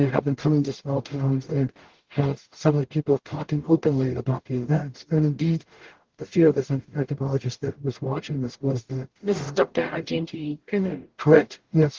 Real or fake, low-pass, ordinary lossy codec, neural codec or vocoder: fake; 7.2 kHz; Opus, 16 kbps; codec, 44.1 kHz, 0.9 kbps, DAC